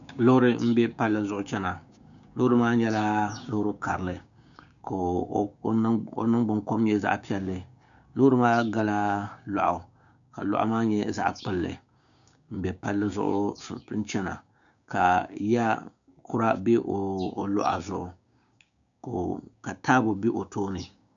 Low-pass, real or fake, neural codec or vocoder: 7.2 kHz; fake; codec, 16 kHz, 6 kbps, DAC